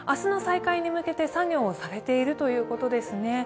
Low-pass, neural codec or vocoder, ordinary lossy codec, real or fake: none; none; none; real